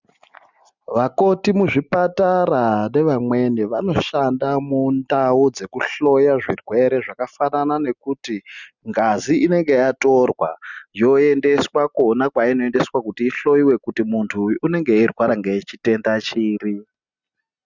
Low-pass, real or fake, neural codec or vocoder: 7.2 kHz; real; none